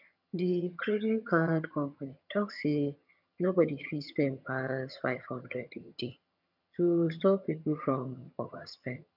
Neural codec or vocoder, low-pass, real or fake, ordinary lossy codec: vocoder, 22.05 kHz, 80 mel bands, HiFi-GAN; 5.4 kHz; fake; none